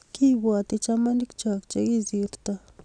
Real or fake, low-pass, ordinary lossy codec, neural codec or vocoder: real; 9.9 kHz; none; none